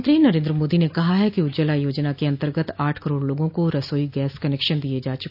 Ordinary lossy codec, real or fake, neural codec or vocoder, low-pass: none; real; none; 5.4 kHz